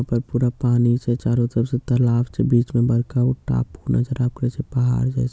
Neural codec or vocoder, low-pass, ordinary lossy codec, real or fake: none; none; none; real